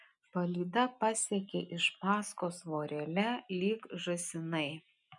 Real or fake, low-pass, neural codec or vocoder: real; 10.8 kHz; none